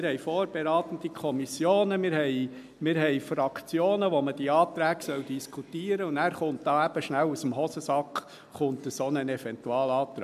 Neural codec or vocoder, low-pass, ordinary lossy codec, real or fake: none; 14.4 kHz; MP3, 96 kbps; real